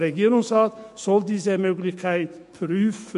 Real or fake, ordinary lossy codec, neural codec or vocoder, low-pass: fake; MP3, 48 kbps; autoencoder, 48 kHz, 128 numbers a frame, DAC-VAE, trained on Japanese speech; 14.4 kHz